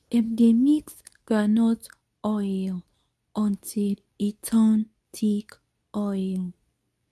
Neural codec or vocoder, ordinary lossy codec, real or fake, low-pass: codec, 24 kHz, 0.9 kbps, WavTokenizer, medium speech release version 2; none; fake; none